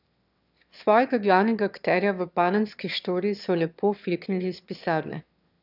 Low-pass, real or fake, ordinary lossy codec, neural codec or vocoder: 5.4 kHz; fake; none; autoencoder, 22.05 kHz, a latent of 192 numbers a frame, VITS, trained on one speaker